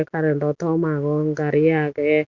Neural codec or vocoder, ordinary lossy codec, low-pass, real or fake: none; Opus, 64 kbps; 7.2 kHz; real